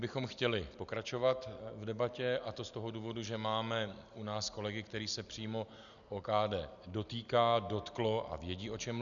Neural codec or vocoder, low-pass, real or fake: none; 7.2 kHz; real